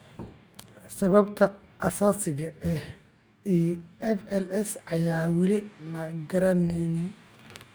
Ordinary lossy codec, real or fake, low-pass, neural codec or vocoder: none; fake; none; codec, 44.1 kHz, 2.6 kbps, DAC